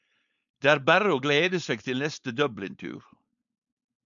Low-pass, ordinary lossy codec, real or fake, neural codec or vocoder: 7.2 kHz; MP3, 96 kbps; fake; codec, 16 kHz, 4.8 kbps, FACodec